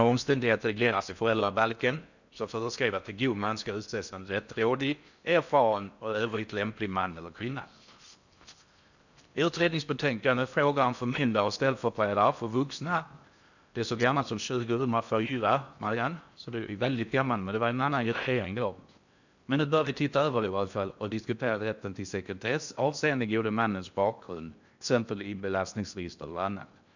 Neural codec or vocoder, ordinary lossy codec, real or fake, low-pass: codec, 16 kHz in and 24 kHz out, 0.6 kbps, FocalCodec, streaming, 4096 codes; none; fake; 7.2 kHz